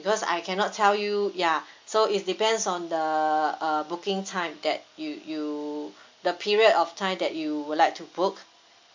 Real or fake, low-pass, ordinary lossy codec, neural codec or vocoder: real; 7.2 kHz; MP3, 64 kbps; none